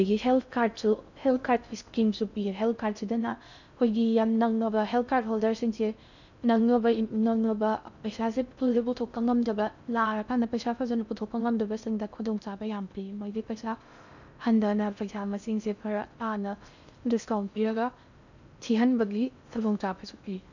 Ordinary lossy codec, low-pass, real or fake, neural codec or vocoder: none; 7.2 kHz; fake; codec, 16 kHz in and 24 kHz out, 0.6 kbps, FocalCodec, streaming, 4096 codes